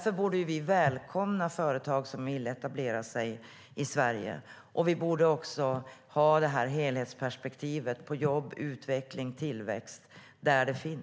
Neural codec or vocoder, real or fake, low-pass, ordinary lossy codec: none; real; none; none